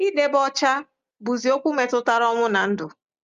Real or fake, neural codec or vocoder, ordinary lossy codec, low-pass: real; none; Opus, 32 kbps; 7.2 kHz